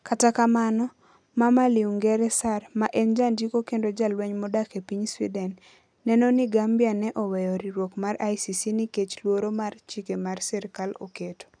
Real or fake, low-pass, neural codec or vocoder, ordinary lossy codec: real; 9.9 kHz; none; none